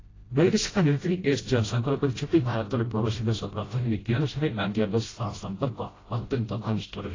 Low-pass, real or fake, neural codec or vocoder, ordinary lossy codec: 7.2 kHz; fake; codec, 16 kHz, 0.5 kbps, FreqCodec, smaller model; AAC, 32 kbps